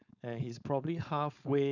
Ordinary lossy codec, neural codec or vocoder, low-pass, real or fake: none; codec, 16 kHz, 4.8 kbps, FACodec; 7.2 kHz; fake